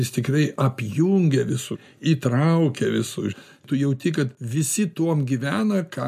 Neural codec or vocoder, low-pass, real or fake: none; 14.4 kHz; real